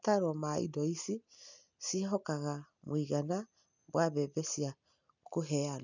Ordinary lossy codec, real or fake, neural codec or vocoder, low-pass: none; real; none; 7.2 kHz